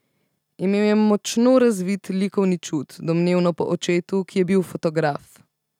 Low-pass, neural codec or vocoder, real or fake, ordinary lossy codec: 19.8 kHz; none; real; none